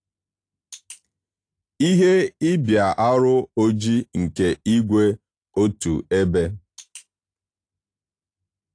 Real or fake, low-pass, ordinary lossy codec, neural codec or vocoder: real; 9.9 kHz; AAC, 48 kbps; none